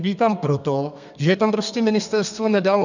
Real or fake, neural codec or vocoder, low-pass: fake; codec, 32 kHz, 1.9 kbps, SNAC; 7.2 kHz